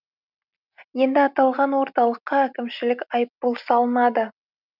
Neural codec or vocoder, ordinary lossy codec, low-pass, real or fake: none; none; 5.4 kHz; real